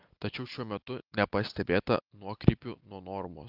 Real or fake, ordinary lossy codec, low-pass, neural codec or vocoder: real; Opus, 32 kbps; 5.4 kHz; none